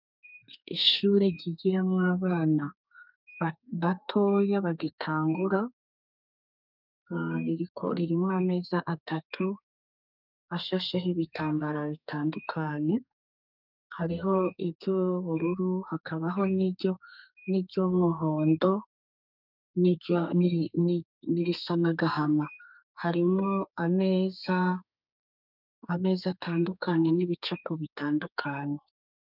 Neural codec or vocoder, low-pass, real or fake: codec, 32 kHz, 1.9 kbps, SNAC; 5.4 kHz; fake